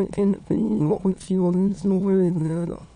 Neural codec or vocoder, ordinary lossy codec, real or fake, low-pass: autoencoder, 22.05 kHz, a latent of 192 numbers a frame, VITS, trained on many speakers; none; fake; 9.9 kHz